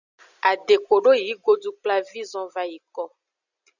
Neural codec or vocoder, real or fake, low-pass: none; real; 7.2 kHz